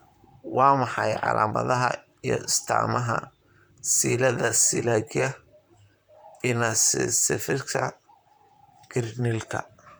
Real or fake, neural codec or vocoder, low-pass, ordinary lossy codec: fake; vocoder, 44.1 kHz, 128 mel bands, Pupu-Vocoder; none; none